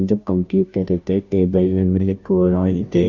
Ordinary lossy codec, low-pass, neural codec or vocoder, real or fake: none; 7.2 kHz; codec, 16 kHz, 0.5 kbps, FunCodec, trained on Chinese and English, 25 frames a second; fake